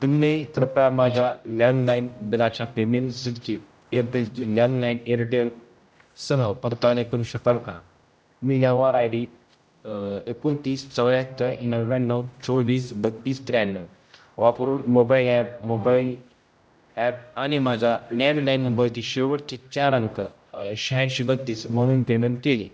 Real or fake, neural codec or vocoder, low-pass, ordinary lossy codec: fake; codec, 16 kHz, 0.5 kbps, X-Codec, HuBERT features, trained on general audio; none; none